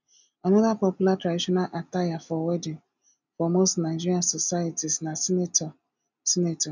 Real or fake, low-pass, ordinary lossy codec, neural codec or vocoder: real; 7.2 kHz; none; none